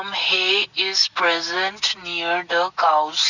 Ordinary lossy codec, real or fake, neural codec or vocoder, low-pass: none; real; none; 7.2 kHz